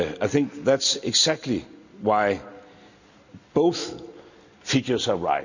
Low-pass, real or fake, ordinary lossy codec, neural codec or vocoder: 7.2 kHz; real; none; none